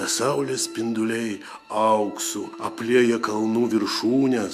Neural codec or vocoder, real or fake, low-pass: autoencoder, 48 kHz, 128 numbers a frame, DAC-VAE, trained on Japanese speech; fake; 14.4 kHz